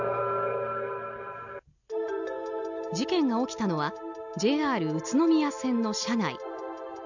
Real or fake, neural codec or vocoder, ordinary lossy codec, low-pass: real; none; none; 7.2 kHz